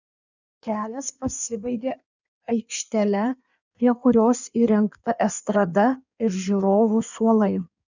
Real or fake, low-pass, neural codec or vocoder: fake; 7.2 kHz; codec, 16 kHz in and 24 kHz out, 1.1 kbps, FireRedTTS-2 codec